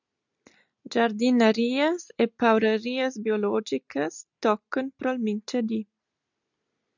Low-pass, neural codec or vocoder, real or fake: 7.2 kHz; none; real